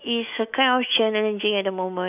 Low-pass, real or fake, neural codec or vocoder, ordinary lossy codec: 3.6 kHz; fake; autoencoder, 48 kHz, 128 numbers a frame, DAC-VAE, trained on Japanese speech; none